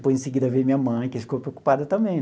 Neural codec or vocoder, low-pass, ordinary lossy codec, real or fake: none; none; none; real